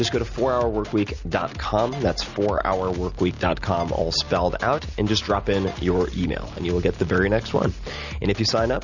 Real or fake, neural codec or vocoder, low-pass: real; none; 7.2 kHz